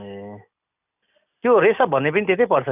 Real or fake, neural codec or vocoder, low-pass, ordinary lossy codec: real; none; 3.6 kHz; none